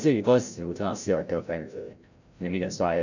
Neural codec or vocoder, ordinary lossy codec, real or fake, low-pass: codec, 16 kHz, 0.5 kbps, FreqCodec, larger model; none; fake; 7.2 kHz